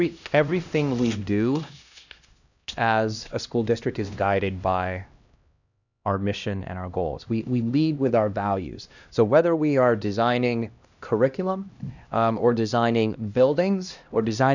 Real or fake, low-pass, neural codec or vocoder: fake; 7.2 kHz; codec, 16 kHz, 1 kbps, X-Codec, HuBERT features, trained on LibriSpeech